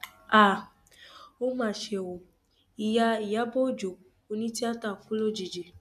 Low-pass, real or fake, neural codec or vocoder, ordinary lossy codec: 14.4 kHz; real; none; none